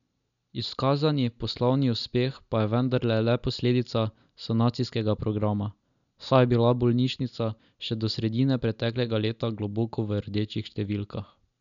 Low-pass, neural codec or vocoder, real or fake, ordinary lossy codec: 7.2 kHz; none; real; none